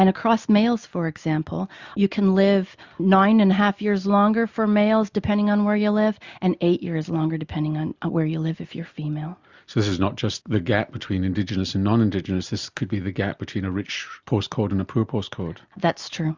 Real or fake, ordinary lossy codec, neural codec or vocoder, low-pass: real; Opus, 64 kbps; none; 7.2 kHz